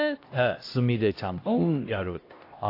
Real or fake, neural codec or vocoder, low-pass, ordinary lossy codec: fake; codec, 16 kHz, 1 kbps, X-Codec, HuBERT features, trained on LibriSpeech; 5.4 kHz; AAC, 32 kbps